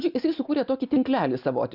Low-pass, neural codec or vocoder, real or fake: 5.4 kHz; none; real